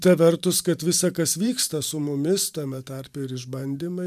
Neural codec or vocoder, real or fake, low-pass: vocoder, 44.1 kHz, 128 mel bands every 512 samples, BigVGAN v2; fake; 14.4 kHz